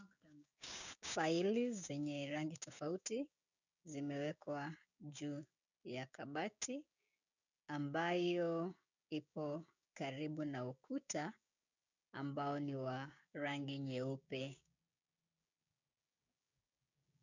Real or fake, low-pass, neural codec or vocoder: fake; 7.2 kHz; codec, 16 kHz, 6 kbps, DAC